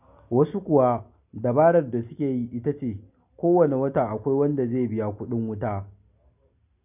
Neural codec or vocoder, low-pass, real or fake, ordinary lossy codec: none; 3.6 kHz; real; none